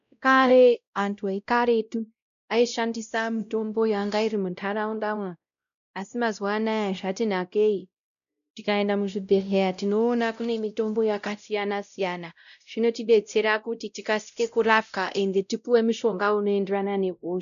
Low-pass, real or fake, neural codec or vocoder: 7.2 kHz; fake; codec, 16 kHz, 0.5 kbps, X-Codec, WavLM features, trained on Multilingual LibriSpeech